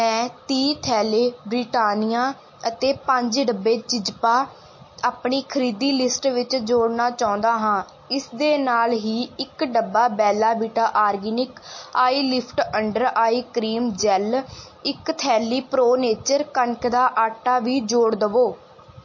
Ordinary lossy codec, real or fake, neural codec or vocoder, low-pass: MP3, 32 kbps; real; none; 7.2 kHz